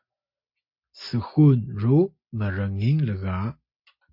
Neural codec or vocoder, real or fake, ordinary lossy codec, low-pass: none; real; MP3, 32 kbps; 5.4 kHz